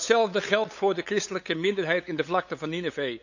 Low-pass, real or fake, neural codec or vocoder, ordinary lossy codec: 7.2 kHz; fake; codec, 16 kHz, 8 kbps, FunCodec, trained on LibriTTS, 25 frames a second; none